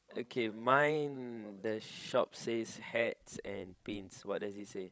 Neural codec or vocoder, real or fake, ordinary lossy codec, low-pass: codec, 16 kHz, 16 kbps, FreqCodec, larger model; fake; none; none